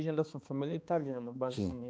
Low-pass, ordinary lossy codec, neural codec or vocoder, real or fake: none; none; codec, 16 kHz, 2 kbps, X-Codec, HuBERT features, trained on balanced general audio; fake